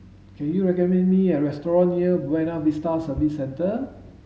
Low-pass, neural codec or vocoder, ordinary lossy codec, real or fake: none; none; none; real